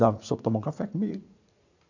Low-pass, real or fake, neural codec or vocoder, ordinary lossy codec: 7.2 kHz; fake; vocoder, 44.1 kHz, 80 mel bands, Vocos; none